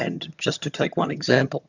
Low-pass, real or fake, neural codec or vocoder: 7.2 kHz; fake; vocoder, 22.05 kHz, 80 mel bands, HiFi-GAN